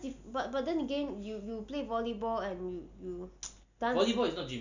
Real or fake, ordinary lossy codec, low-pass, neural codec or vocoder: real; none; 7.2 kHz; none